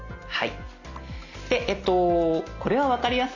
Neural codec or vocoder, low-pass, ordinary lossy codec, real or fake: none; 7.2 kHz; none; real